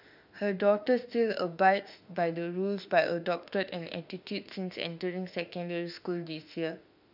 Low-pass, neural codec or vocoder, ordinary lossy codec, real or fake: 5.4 kHz; autoencoder, 48 kHz, 32 numbers a frame, DAC-VAE, trained on Japanese speech; none; fake